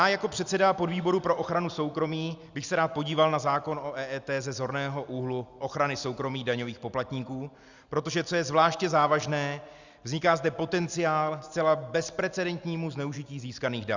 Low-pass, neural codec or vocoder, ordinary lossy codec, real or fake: 7.2 kHz; none; Opus, 64 kbps; real